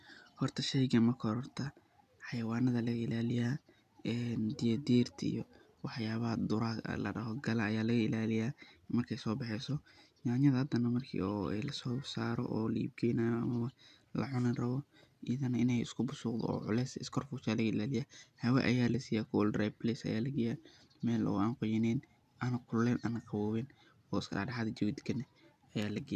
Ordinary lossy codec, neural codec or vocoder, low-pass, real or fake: none; none; 14.4 kHz; real